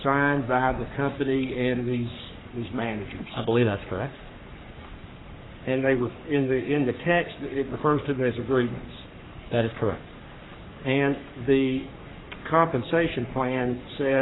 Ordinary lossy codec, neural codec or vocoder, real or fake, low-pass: AAC, 16 kbps; codec, 44.1 kHz, 3.4 kbps, Pupu-Codec; fake; 7.2 kHz